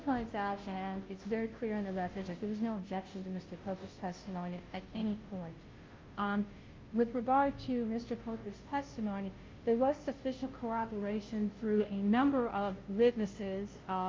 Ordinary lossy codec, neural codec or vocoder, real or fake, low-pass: Opus, 32 kbps; codec, 16 kHz, 0.5 kbps, FunCodec, trained on Chinese and English, 25 frames a second; fake; 7.2 kHz